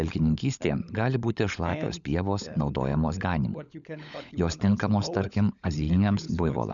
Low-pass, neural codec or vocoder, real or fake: 7.2 kHz; codec, 16 kHz, 16 kbps, FunCodec, trained on LibriTTS, 50 frames a second; fake